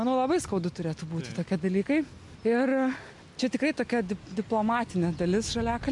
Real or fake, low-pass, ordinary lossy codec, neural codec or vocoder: real; 10.8 kHz; MP3, 64 kbps; none